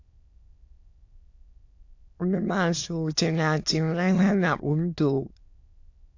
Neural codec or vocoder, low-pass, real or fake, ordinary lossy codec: autoencoder, 22.05 kHz, a latent of 192 numbers a frame, VITS, trained on many speakers; 7.2 kHz; fake; AAC, 48 kbps